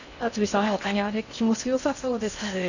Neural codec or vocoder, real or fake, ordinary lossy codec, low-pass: codec, 16 kHz in and 24 kHz out, 0.6 kbps, FocalCodec, streaming, 2048 codes; fake; none; 7.2 kHz